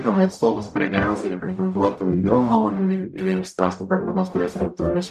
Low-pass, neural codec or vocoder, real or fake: 14.4 kHz; codec, 44.1 kHz, 0.9 kbps, DAC; fake